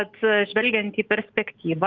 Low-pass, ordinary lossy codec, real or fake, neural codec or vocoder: 7.2 kHz; Opus, 24 kbps; real; none